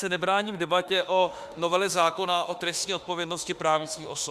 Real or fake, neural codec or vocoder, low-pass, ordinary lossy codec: fake; autoencoder, 48 kHz, 32 numbers a frame, DAC-VAE, trained on Japanese speech; 14.4 kHz; Opus, 64 kbps